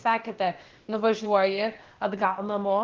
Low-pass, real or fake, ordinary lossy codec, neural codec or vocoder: 7.2 kHz; fake; Opus, 16 kbps; codec, 16 kHz, 0.8 kbps, ZipCodec